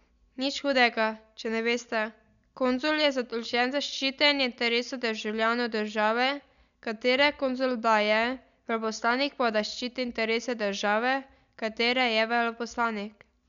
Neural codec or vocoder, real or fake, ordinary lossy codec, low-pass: none; real; none; 7.2 kHz